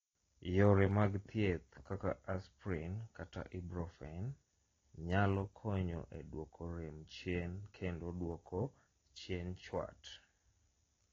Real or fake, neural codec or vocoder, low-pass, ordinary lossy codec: real; none; 7.2 kHz; AAC, 32 kbps